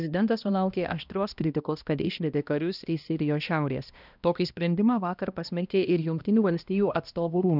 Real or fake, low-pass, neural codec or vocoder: fake; 5.4 kHz; codec, 16 kHz, 1 kbps, X-Codec, HuBERT features, trained on balanced general audio